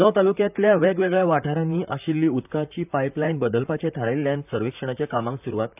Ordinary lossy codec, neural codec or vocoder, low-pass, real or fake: none; vocoder, 44.1 kHz, 128 mel bands, Pupu-Vocoder; 3.6 kHz; fake